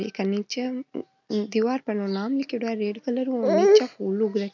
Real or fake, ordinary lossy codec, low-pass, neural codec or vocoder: real; none; 7.2 kHz; none